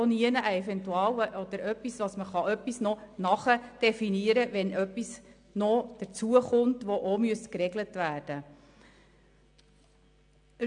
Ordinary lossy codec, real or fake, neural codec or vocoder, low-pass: AAC, 64 kbps; real; none; 9.9 kHz